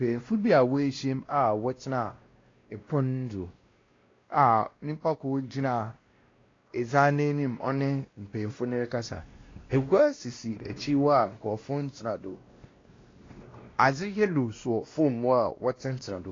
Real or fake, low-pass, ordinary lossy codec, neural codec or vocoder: fake; 7.2 kHz; AAC, 32 kbps; codec, 16 kHz, 1 kbps, X-Codec, WavLM features, trained on Multilingual LibriSpeech